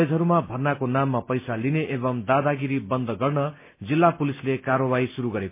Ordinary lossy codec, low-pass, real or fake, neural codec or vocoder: MP3, 24 kbps; 3.6 kHz; real; none